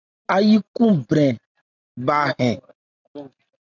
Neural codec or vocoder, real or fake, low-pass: none; real; 7.2 kHz